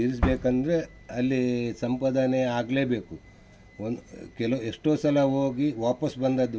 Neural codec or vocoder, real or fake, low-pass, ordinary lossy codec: none; real; none; none